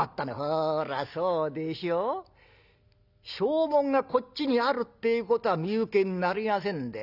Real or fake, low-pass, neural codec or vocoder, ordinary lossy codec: real; 5.4 kHz; none; none